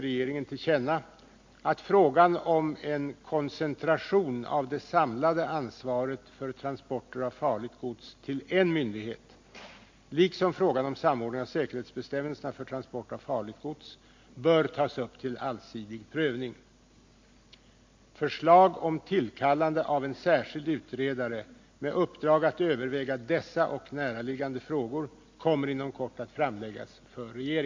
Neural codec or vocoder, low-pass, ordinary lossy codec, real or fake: none; 7.2 kHz; MP3, 48 kbps; real